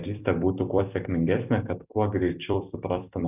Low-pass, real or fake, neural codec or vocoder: 3.6 kHz; fake; vocoder, 44.1 kHz, 128 mel bands every 256 samples, BigVGAN v2